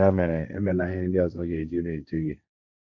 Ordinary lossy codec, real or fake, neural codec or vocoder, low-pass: none; fake; codec, 16 kHz, 1.1 kbps, Voila-Tokenizer; 7.2 kHz